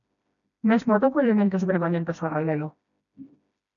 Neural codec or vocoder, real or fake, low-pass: codec, 16 kHz, 1 kbps, FreqCodec, smaller model; fake; 7.2 kHz